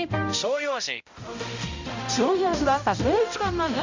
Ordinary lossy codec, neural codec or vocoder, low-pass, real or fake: MP3, 64 kbps; codec, 16 kHz, 0.5 kbps, X-Codec, HuBERT features, trained on balanced general audio; 7.2 kHz; fake